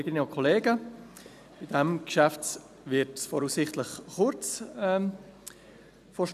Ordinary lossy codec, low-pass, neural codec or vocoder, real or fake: none; 14.4 kHz; none; real